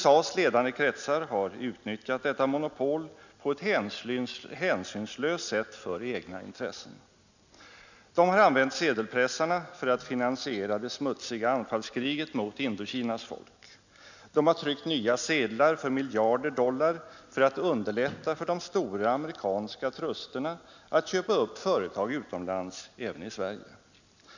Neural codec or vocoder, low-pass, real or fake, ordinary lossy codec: none; 7.2 kHz; real; none